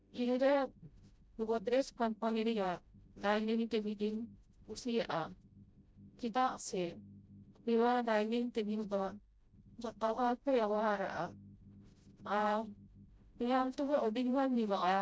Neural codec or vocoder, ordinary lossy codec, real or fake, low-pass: codec, 16 kHz, 0.5 kbps, FreqCodec, smaller model; none; fake; none